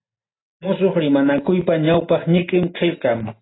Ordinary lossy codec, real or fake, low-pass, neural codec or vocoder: AAC, 16 kbps; real; 7.2 kHz; none